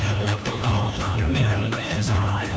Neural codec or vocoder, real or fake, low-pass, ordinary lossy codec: codec, 16 kHz, 1 kbps, FunCodec, trained on LibriTTS, 50 frames a second; fake; none; none